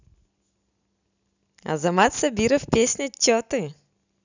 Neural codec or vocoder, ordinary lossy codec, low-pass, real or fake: none; none; 7.2 kHz; real